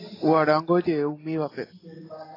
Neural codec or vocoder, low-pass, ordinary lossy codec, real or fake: none; 5.4 kHz; AAC, 24 kbps; real